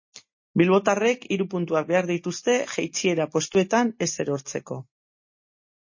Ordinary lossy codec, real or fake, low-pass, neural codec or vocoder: MP3, 32 kbps; real; 7.2 kHz; none